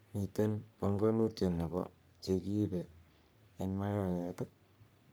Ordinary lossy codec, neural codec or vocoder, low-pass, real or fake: none; codec, 44.1 kHz, 3.4 kbps, Pupu-Codec; none; fake